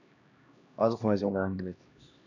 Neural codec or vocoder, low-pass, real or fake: codec, 16 kHz, 1 kbps, X-Codec, HuBERT features, trained on LibriSpeech; 7.2 kHz; fake